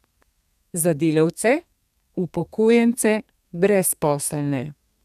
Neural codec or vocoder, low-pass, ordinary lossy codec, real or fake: codec, 32 kHz, 1.9 kbps, SNAC; 14.4 kHz; none; fake